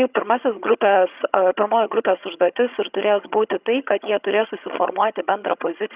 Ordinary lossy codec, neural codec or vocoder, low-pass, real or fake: Opus, 64 kbps; vocoder, 22.05 kHz, 80 mel bands, HiFi-GAN; 3.6 kHz; fake